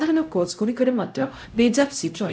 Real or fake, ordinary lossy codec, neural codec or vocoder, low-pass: fake; none; codec, 16 kHz, 0.5 kbps, X-Codec, HuBERT features, trained on LibriSpeech; none